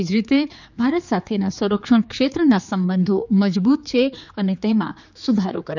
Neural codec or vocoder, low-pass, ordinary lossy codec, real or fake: codec, 16 kHz, 4 kbps, X-Codec, HuBERT features, trained on general audio; 7.2 kHz; none; fake